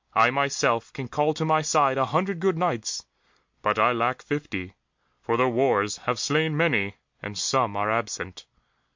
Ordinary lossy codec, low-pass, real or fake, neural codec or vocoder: MP3, 48 kbps; 7.2 kHz; real; none